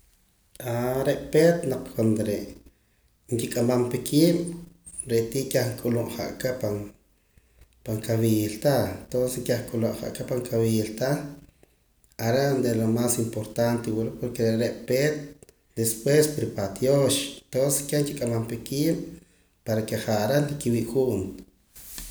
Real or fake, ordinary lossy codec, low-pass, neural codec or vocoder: real; none; none; none